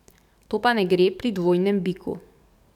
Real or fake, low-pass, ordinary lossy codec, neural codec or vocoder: fake; 19.8 kHz; none; codec, 44.1 kHz, 7.8 kbps, DAC